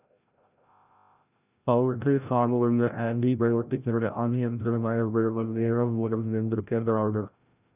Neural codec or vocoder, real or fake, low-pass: codec, 16 kHz, 0.5 kbps, FreqCodec, larger model; fake; 3.6 kHz